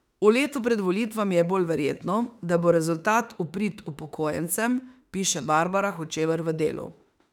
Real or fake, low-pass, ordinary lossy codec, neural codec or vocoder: fake; 19.8 kHz; none; autoencoder, 48 kHz, 32 numbers a frame, DAC-VAE, trained on Japanese speech